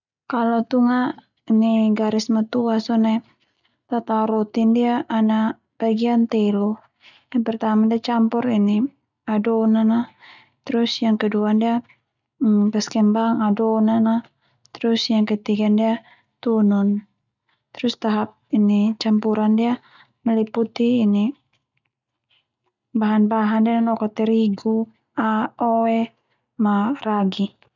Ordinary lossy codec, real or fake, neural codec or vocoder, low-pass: none; real; none; 7.2 kHz